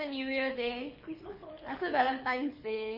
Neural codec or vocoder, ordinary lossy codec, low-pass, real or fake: codec, 24 kHz, 6 kbps, HILCodec; MP3, 24 kbps; 5.4 kHz; fake